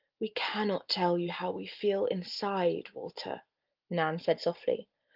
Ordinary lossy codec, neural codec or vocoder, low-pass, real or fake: Opus, 32 kbps; none; 5.4 kHz; real